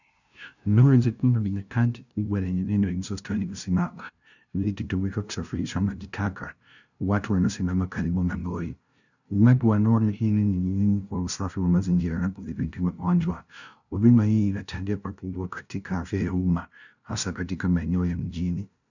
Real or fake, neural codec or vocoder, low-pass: fake; codec, 16 kHz, 0.5 kbps, FunCodec, trained on LibriTTS, 25 frames a second; 7.2 kHz